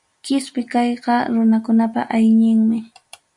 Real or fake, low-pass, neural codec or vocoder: real; 10.8 kHz; none